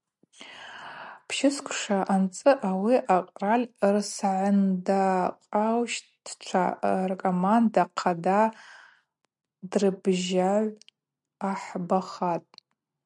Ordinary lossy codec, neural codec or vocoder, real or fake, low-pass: MP3, 96 kbps; none; real; 10.8 kHz